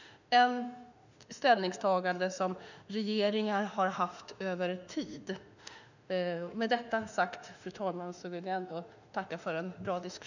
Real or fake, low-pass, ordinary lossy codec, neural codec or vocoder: fake; 7.2 kHz; none; autoencoder, 48 kHz, 32 numbers a frame, DAC-VAE, trained on Japanese speech